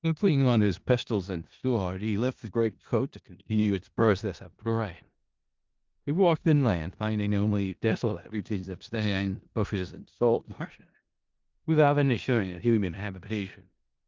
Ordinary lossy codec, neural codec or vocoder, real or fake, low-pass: Opus, 32 kbps; codec, 16 kHz in and 24 kHz out, 0.4 kbps, LongCat-Audio-Codec, four codebook decoder; fake; 7.2 kHz